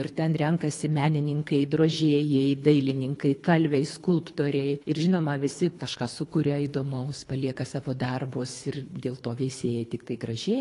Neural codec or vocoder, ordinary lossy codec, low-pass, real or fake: codec, 24 kHz, 3 kbps, HILCodec; AAC, 48 kbps; 10.8 kHz; fake